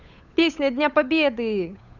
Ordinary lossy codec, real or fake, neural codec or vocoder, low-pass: none; fake; codec, 16 kHz, 8 kbps, FunCodec, trained on Chinese and English, 25 frames a second; 7.2 kHz